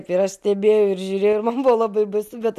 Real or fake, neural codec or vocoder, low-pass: real; none; 14.4 kHz